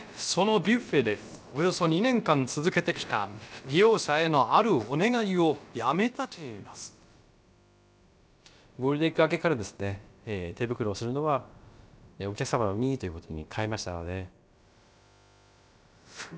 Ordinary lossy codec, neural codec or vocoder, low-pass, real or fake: none; codec, 16 kHz, about 1 kbps, DyCAST, with the encoder's durations; none; fake